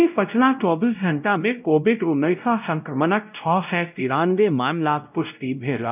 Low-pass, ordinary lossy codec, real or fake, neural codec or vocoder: 3.6 kHz; none; fake; codec, 16 kHz, 0.5 kbps, X-Codec, WavLM features, trained on Multilingual LibriSpeech